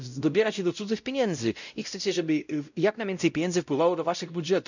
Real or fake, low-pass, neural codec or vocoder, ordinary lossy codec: fake; 7.2 kHz; codec, 16 kHz, 0.5 kbps, X-Codec, WavLM features, trained on Multilingual LibriSpeech; none